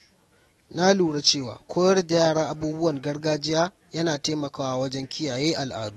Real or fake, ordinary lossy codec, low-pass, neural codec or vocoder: fake; AAC, 32 kbps; 19.8 kHz; autoencoder, 48 kHz, 128 numbers a frame, DAC-VAE, trained on Japanese speech